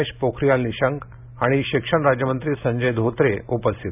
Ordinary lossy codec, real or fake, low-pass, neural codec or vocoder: none; real; 3.6 kHz; none